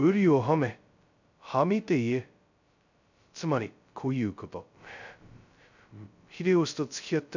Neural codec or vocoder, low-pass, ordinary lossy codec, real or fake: codec, 16 kHz, 0.2 kbps, FocalCodec; 7.2 kHz; none; fake